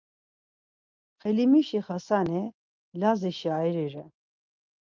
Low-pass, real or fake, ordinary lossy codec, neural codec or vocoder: 7.2 kHz; real; Opus, 24 kbps; none